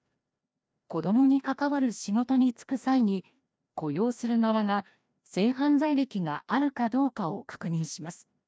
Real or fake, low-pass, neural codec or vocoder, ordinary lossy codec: fake; none; codec, 16 kHz, 1 kbps, FreqCodec, larger model; none